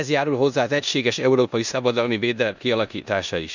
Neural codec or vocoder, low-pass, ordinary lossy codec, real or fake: codec, 16 kHz in and 24 kHz out, 0.9 kbps, LongCat-Audio-Codec, four codebook decoder; 7.2 kHz; none; fake